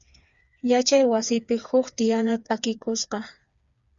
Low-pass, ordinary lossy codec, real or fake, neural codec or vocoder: 7.2 kHz; Opus, 64 kbps; fake; codec, 16 kHz, 4 kbps, FreqCodec, smaller model